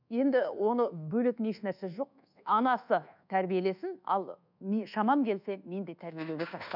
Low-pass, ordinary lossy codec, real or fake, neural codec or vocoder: 5.4 kHz; none; fake; codec, 24 kHz, 1.2 kbps, DualCodec